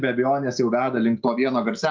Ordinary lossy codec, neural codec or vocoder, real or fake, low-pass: Opus, 32 kbps; none; real; 7.2 kHz